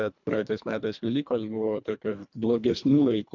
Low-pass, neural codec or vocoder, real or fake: 7.2 kHz; codec, 24 kHz, 1.5 kbps, HILCodec; fake